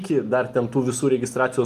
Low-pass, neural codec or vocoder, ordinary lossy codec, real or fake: 14.4 kHz; none; Opus, 32 kbps; real